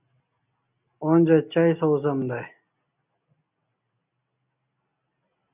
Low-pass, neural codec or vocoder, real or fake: 3.6 kHz; none; real